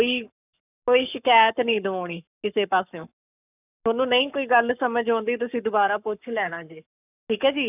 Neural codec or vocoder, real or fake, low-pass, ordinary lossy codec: codec, 44.1 kHz, 7.8 kbps, Pupu-Codec; fake; 3.6 kHz; none